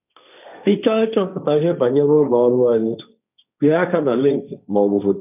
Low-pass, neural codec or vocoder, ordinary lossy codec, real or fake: 3.6 kHz; codec, 16 kHz, 1.1 kbps, Voila-Tokenizer; none; fake